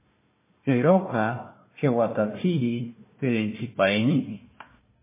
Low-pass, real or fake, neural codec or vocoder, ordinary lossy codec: 3.6 kHz; fake; codec, 16 kHz, 1 kbps, FunCodec, trained on Chinese and English, 50 frames a second; MP3, 16 kbps